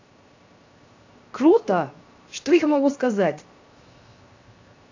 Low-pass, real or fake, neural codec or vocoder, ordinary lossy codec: 7.2 kHz; fake; codec, 16 kHz, 0.7 kbps, FocalCodec; AAC, 48 kbps